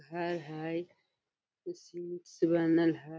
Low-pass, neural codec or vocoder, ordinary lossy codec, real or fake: none; none; none; real